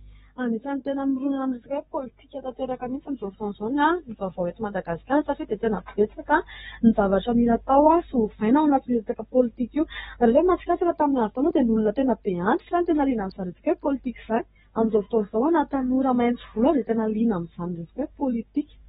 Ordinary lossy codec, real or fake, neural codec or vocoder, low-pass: AAC, 16 kbps; fake; codec, 44.1 kHz, 7.8 kbps, DAC; 19.8 kHz